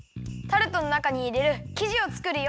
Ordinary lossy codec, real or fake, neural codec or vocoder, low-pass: none; real; none; none